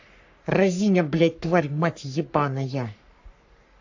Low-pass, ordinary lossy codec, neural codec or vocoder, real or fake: 7.2 kHz; AAC, 48 kbps; codec, 44.1 kHz, 3.4 kbps, Pupu-Codec; fake